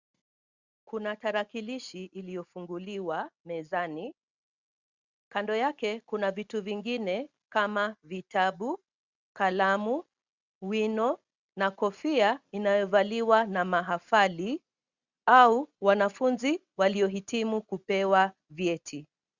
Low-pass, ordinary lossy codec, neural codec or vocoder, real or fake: 7.2 kHz; Opus, 64 kbps; none; real